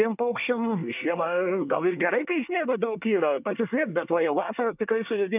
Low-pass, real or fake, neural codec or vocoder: 3.6 kHz; fake; codec, 24 kHz, 1 kbps, SNAC